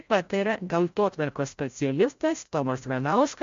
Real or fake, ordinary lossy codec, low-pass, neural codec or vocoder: fake; MP3, 48 kbps; 7.2 kHz; codec, 16 kHz, 0.5 kbps, FreqCodec, larger model